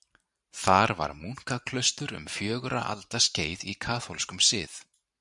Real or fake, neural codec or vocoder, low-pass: fake; vocoder, 44.1 kHz, 128 mel bands every 512 samples, BigVGAN v2; 10.8 kHz